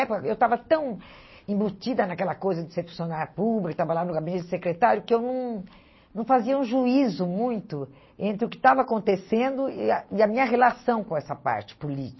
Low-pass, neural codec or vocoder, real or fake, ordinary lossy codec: 7.2 kHz; none; real; MP3, 24 kbps